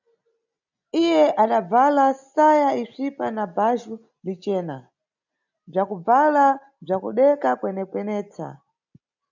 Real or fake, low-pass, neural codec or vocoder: real; 7.2 kHz; none